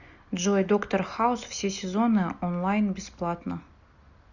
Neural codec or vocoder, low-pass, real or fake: none; 7.2 kHz; real